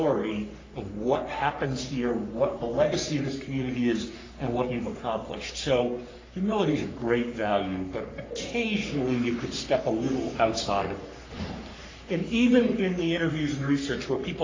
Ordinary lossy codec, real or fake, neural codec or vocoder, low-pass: AAC, 32 kbps; fake; codec, 44.1 kHz, 3.4 kbps, Pupu-Codec; 7.2 kHz